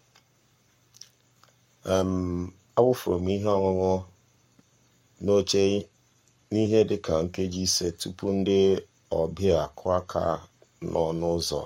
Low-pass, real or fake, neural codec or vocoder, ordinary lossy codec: 19.8 kHz; fake; codec, 44.1 kHz, 7.8 kbps, Pupu-Codec; MP3, 64 kbps